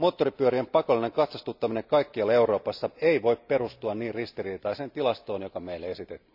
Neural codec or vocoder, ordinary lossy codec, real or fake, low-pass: none; none; real; 5.4 kHz